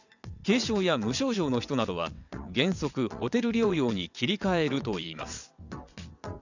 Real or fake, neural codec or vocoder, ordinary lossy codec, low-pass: fake; vocoder, 22.05 kHz, 80 mel bands, WaveNeXt; none; 7.2 kHz